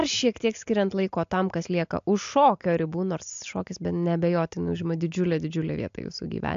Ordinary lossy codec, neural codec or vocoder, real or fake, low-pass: AAC, 64 kbps; none; real; 7.2 kHz